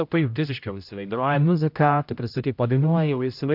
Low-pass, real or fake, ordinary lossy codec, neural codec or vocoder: 5.4 kHz; fake; MP3, 48 kbps; codec, 16 kHz, 0.5 kbps, X-Codec, HuBERT features, trained on general audio